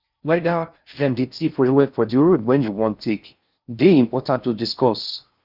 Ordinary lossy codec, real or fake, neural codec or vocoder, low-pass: Opus, 64 kbps; fake; codec, 16 kHz in and 24 kHz out, 0.6 kbps, FocalCodec, streaming, 4096 codes; 5.4 kHz